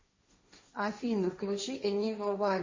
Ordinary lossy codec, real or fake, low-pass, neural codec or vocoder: MP3, 32 kbps; fake; 7.2 kHz; codec, 16 kHz, 1.1 kbps, Voila-Tokenizer